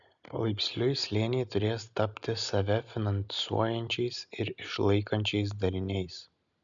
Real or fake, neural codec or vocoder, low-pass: real; none; 7.2 kHz